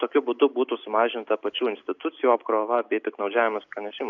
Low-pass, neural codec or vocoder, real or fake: 7.2 kHz; none; real